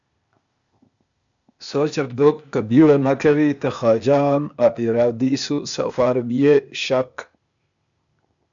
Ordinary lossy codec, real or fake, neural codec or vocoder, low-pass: MP3, 64 kbps; fake; codec, 16 kHz, 0.8 kbps, ZipCodec; 7.2 kHz